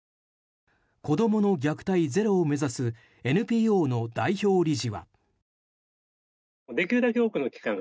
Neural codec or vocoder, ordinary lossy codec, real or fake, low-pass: none; none; real; none